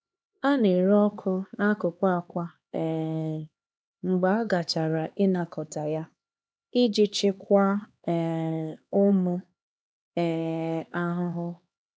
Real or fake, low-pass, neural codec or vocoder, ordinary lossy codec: fake; none; codec, 16 kHz, 2 kbps, X-Codec, HuBERT features, trained on LibriSpeech; none